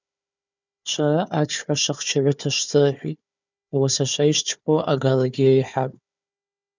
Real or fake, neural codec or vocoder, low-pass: fake; codec, 16 kHz, 4 kbps, FunCodec, trained on Chinese and English, 50 frames a second; 7.2 kHz